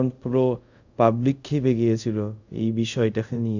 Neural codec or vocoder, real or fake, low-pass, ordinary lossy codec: codec, 24 kHz, 0.5 kbps, DualCodec; fake; 7.2 kHz; none